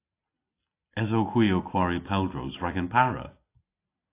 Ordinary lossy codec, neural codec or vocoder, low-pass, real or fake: AAC, 24 kbps; none; 3.6 kHz; real